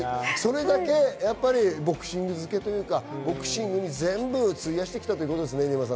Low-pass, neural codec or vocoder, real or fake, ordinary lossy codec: none; none; real; none